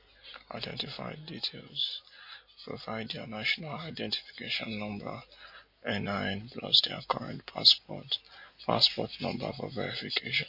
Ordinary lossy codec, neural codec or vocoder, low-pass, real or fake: MP3, 32 kbps; none; 5.4 kHz; real